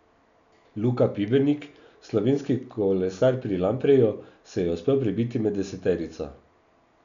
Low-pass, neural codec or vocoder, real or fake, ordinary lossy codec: 7.2 kHz; none; real; none